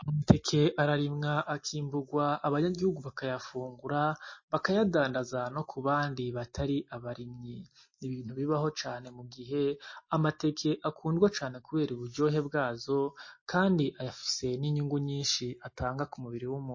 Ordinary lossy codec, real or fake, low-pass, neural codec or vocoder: MP3, 32 kbps; real; 7.2 kHz; none